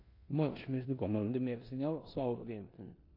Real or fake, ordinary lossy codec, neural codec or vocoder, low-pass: fake; MP3, 32 kbps; codec, 16 kHz in and 24 kHz out, 0.9 kbps, LongCat-Audio-Codec, four codebook decoder; 5.4 kHz